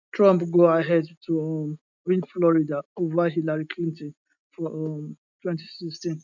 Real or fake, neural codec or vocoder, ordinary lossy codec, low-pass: real; none; none; 7.2 kHz